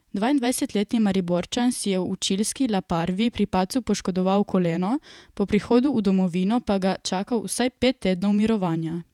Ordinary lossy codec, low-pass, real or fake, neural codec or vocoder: none; 19.8 kHz; fake; vocoder, 48 kHz, 128 mel bands, Vocos